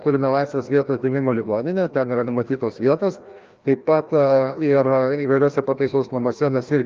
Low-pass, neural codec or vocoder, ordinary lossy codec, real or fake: 7.2 kHz; codec, 16 kHz, 1 kbps, FreqCodec, larger model; Opus, 24 kbps; fake